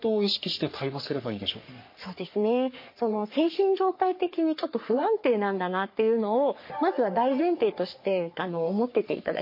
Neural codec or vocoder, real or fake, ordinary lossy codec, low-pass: codec, 44.1 kHz, 3.4 kbps, Pupu-Codec; fake; MP3, 32 kbps; 5.4 kHz